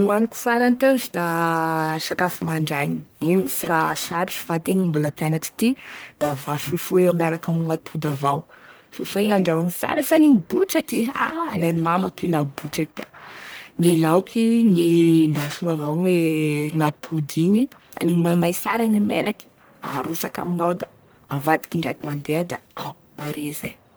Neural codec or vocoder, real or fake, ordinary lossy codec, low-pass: codec, 44.1 kHz, 1.7 kbps, Pupu-Codec; fake; none; none